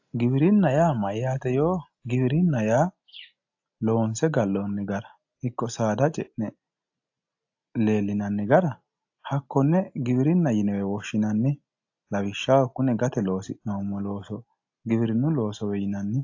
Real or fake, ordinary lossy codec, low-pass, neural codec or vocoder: real; AAC, 48 kbps; 7.2 kHz; none